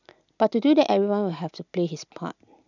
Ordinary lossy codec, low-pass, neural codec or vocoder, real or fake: none; 7.2 kHz; none; real